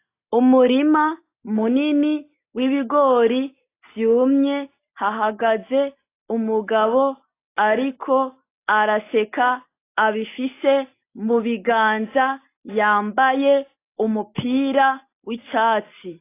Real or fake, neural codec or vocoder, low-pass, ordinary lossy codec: real; none; 3.6 kHz; AAC, 24 kbps